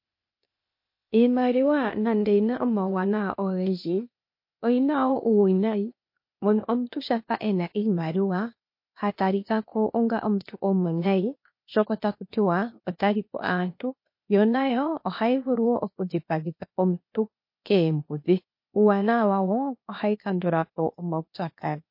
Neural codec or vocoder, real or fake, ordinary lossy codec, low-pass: codec, 16 kHz, 0.8 kbps, ZipCodec; fake; MP3, 32 kbps; 5.4 kHz